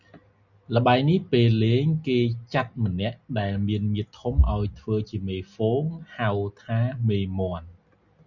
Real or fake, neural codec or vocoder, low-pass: real; none; 7.2 kHz